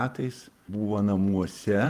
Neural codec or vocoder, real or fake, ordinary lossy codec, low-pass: vocoder, 48 kHz, 128 mel bands, Vocos; fake; Opus, 32 kbps; 14.4 kHz